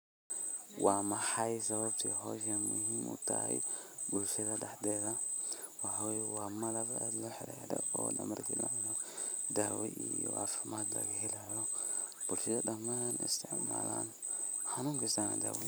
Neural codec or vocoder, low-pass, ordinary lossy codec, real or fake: none; none; none; real